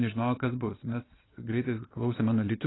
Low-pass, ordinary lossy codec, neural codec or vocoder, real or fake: 7.2 kHz; AAC, 16 kbps; vocoder, 22.05 kHz, 80 mel bands, Vocos; fake